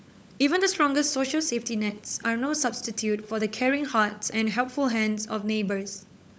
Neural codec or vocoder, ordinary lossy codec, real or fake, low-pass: codec, 16 kHz, 16 kbps, FunCodec, trained on LibriTTS, 50 frames a second; none; fake; none